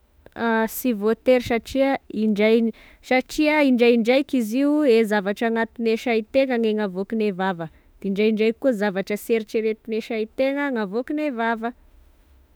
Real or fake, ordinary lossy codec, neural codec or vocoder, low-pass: fake; none; autoencoder, 48 kHz, 32 numbers a frame, DAC-VAE, trained on Japanese speech; none